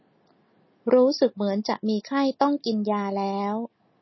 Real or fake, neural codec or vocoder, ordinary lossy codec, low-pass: real; none; MP3, 24 kbps; 7.2 kHz